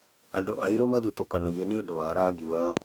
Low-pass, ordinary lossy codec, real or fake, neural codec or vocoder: 19.8 kHz; none; fake; codec, 44.1 kHz, 2.6 kbps, DAC